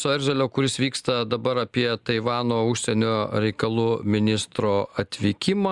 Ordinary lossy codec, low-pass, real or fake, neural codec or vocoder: Opus, 64 kbps; 10.8 kHz; real; none